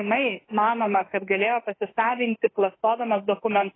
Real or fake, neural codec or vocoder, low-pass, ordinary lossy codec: fake; autoencoder, 48 kHz, 32 numbers a frame, DAC-VAE, trained on Japanese speech; 7.2 kHz; AAC, 16 kbps